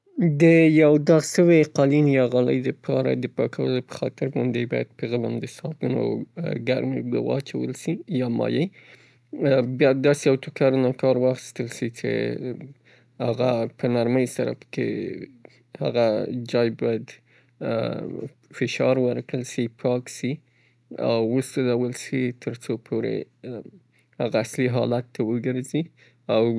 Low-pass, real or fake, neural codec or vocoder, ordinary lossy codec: none; real; none; none